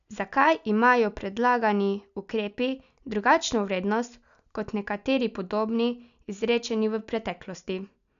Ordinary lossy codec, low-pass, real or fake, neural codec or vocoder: none; 7.2 kHz; real; none